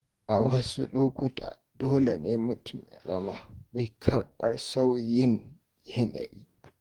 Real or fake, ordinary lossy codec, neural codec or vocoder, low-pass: fake; Opus, 24 kbps; codec, 44.1 kHz, 2.6 kbps, DAC; 19.8 kHz